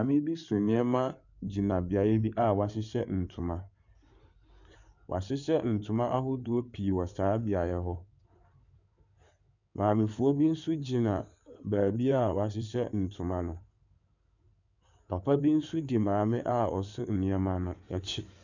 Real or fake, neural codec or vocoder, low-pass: fake; codec, 16 kHz in and 24 kHz out, 2.2 kbps, FireRedTTS-2 codec; 7.2 kHz